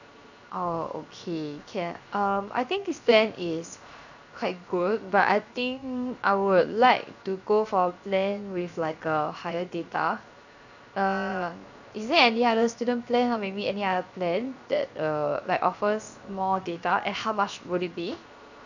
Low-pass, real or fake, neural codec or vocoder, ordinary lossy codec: 7.2 kHz; fake; codec, 16 kHz, 0.7 kbps, FocalCodec; none